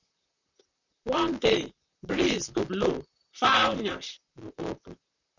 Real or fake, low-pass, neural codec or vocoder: fake; 7.2 kHz; vocoder, 44.1 kHz, 128 mel bands, Pupu-Vocoder